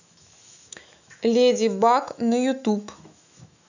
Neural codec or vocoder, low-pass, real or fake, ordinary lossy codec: autoencoder, 48 kHz, 128 numbers a frame, DAC-VAE, trained on Japanese speech; 7.2 kHz; fake; none